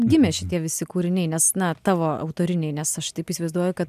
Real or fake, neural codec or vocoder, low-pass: real; none; 14.4 kHz